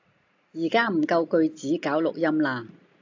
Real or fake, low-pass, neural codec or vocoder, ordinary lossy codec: real; 7.2 kHz; none; AAC, 48 kbps